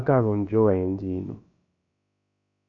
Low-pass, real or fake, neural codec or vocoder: 7.2 kHz; fake; codec, 16 kHz, about 1 kbps, DyCAST, with the encoder's durations